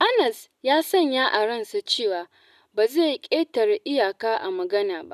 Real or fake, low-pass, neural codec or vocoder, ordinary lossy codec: real; 14.4 kHz; none; none